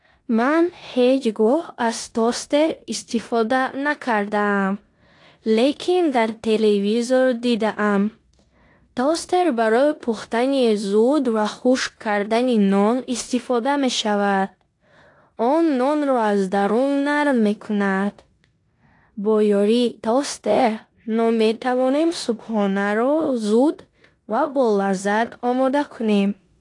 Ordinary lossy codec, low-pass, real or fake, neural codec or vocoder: AAC, 48 kbps; 10.8 kHz; fake; codec, 16 kHz in and 24 kHz out, 0.9 kbps, LongCat-Audio-Codec, four codebook decoder